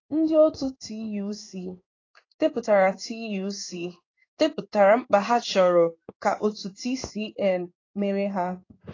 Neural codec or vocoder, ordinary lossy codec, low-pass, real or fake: codec, 16 kHz in and 24 kHz out, 1 kbps, XY-Tokenizer; AAC, 32 kbps; 7.2 kHz; fake